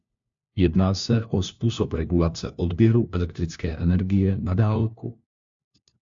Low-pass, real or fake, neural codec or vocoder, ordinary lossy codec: 7.2 kHz; fake; codec, 16 kHz, 1 kbps, FunCodec, trained on LibriTTS, 50 frames a second; AAC, 64 kbps